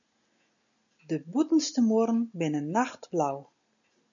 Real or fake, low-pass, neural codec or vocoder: real; 7.2 kHz; none